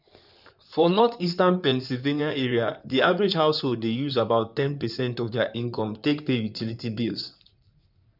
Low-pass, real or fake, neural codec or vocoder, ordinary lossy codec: 5.4 kHz; fake; codec, 16 kHz in and 24 kHz out, 2.2 kbps, FireRedTTS-2 codec; none